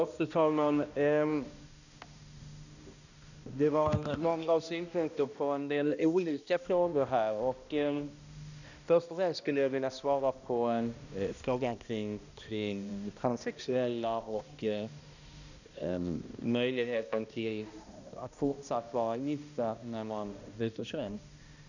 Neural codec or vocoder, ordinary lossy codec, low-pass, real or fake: codec, 16 kHz, 1 kbps, X-Codec, HuBERT features, trained on balanced general audio; none; 7.2 kHz; fake